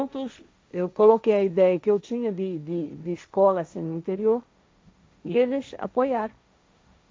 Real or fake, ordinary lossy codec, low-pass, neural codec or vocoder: fake; none; none; codec, 16 kHz, 1.1 kbps, Voila-Tokenizer